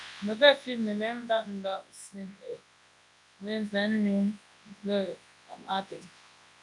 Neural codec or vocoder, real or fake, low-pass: codec, 24 kHz, 0.9 kbps, WavTokenizer, large speech release; fake; 10.8 kHz